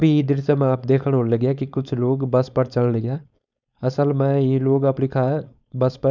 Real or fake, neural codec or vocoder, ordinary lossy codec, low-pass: fake; codec, 16 kHz, 4.8 kbps, FACodec; none; 7.2 kHz